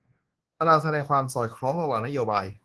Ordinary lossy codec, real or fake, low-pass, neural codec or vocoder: Opus, 16 kbps; fake; 10.8 kHz; codec, 24 kHz, 1.2 kbps, DualCodec